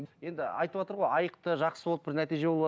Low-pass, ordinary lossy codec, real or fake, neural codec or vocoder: none; none; real; none